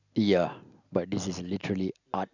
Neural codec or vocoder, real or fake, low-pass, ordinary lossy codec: none; real; 7.2 kHz; none